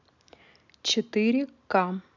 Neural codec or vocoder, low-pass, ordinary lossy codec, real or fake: none; 7.2 kHz; none; real